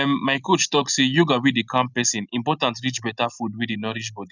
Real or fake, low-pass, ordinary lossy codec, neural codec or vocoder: real; 7.2 kHz; none; none